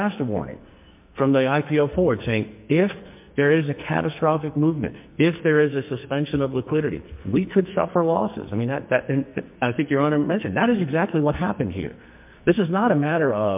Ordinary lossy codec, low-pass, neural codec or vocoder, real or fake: MP3, 32 kbps; 3.6 kHz; codec, 44.1 kHz, 2.6 kbps, SNAC; fake